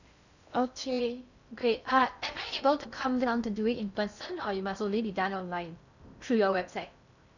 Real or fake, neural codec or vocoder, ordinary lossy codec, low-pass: fake; codec, 16 kHz in and 24 kHz out, 0.6 kbps, FocalCodec, streaming, 2048 codes; none; 7.2 kHz